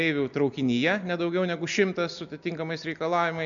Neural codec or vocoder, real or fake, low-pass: none; real; 7.2 kHz